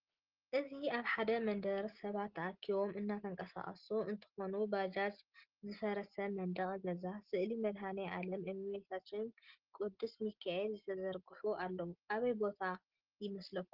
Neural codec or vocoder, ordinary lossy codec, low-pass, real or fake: none; Opus, 16 kbps; 5.4 kHz; real